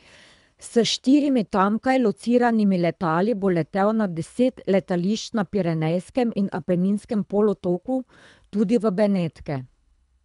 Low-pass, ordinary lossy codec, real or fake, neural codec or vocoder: 10.8 kHz; none; fake; codec, 24 kHz, 3 kbps, HILCodec